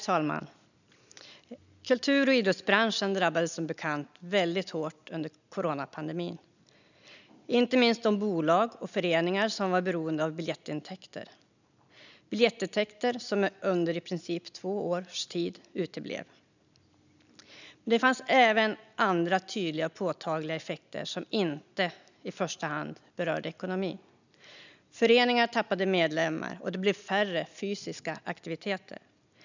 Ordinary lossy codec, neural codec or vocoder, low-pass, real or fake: none; none; 7.2 kHz; real